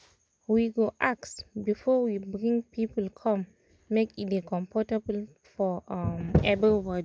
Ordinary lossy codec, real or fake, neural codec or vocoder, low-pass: none; real; none; none